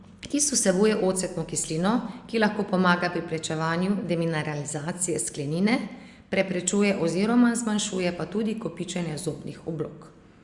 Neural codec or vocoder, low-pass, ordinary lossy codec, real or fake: none; 10.8 kHz; Opus, 64 kbps; real